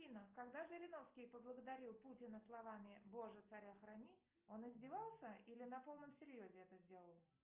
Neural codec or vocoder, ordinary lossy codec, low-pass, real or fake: none; Opus, 24 kbps; 3.6 kHz; real